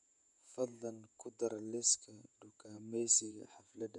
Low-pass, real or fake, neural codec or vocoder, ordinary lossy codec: 10.8 kHz; fake; vocoder, 48 kHz, 128 mel bands, Vocos; none